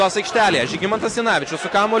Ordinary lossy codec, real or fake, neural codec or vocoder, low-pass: AAC, 48 kbps; real; none; 10.8 kHz